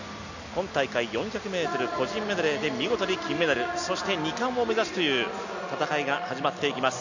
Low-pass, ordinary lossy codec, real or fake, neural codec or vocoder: 7.2 kHz; none; real; none